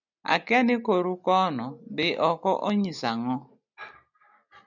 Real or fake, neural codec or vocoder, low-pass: real; none; 7.2 kHz